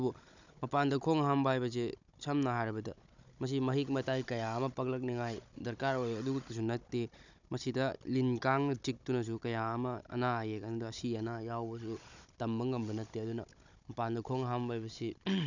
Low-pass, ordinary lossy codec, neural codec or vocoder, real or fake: 7.2 kHz; none; codec, 16 kHz, 16 kbps, FunCodec, trained on Chinese and English, 50 frames a second; fake